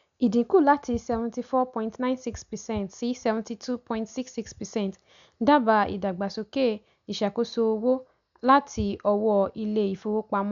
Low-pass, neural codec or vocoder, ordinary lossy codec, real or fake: 7.2 kHz; none; none; real